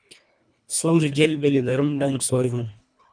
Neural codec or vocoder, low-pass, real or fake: codec, 24 kHz, 1.5 kbps, HILCodec; 9.9 kHz; fake